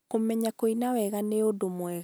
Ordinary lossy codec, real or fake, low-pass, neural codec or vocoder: none; real; none; none